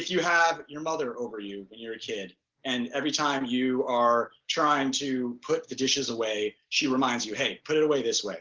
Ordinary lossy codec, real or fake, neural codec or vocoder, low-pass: Opus, 16 kbps; real; none; 7.2 kHz